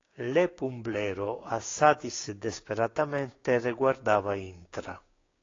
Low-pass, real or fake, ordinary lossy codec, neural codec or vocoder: 7.2 kHz; fake; AAC, 32 kbps; codec, 16 kHz, 6 kbps, DAC